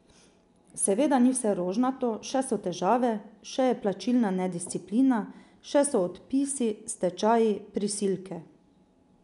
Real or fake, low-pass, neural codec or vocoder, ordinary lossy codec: real; 10.8 kHz; none; none